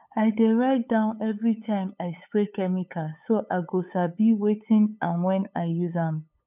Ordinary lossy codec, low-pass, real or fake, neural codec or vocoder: none; 3.6 kHz; fake; codec, 16 kHz, 8 kbps, FunCodec, trained on LibriTTS, 25 frames a second